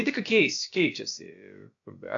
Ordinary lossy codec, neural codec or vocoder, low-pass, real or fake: AAC, 48 kbps; codec, 16 kHz, about 1 kbps, DyCAST, with the encoder's durations; 7.2 kHz; fake